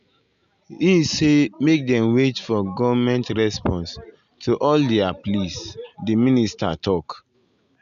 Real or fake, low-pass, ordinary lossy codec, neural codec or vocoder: real; 7.2 kHz; none; none